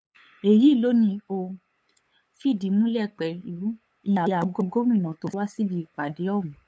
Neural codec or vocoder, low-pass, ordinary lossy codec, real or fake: codec, 16 kHz, 8 kbps, FunCodec, trained on LibriTTS, 25 frames a second; none; none; fake